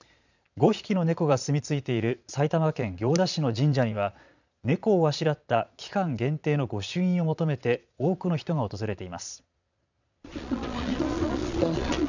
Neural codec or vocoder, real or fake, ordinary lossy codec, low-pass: vocoder, 22.05 kHz, 80 mel bands, Vocos; fake; none; 7.2 kHz